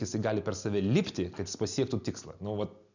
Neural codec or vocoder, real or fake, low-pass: none; real; 7.2 kHz